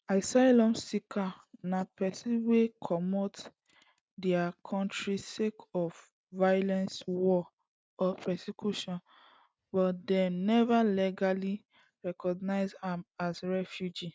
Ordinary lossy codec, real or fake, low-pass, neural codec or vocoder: none; real; none; none